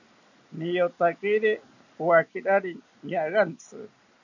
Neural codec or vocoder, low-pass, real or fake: none; 7.2 kHz; real